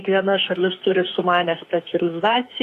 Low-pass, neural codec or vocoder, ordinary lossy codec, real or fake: 14.4 kHz; autoencoder, 48 kHz, 32 numbers a frame, DAC-VAE, trained on Japanese speech; AAC, 48 kbps; fake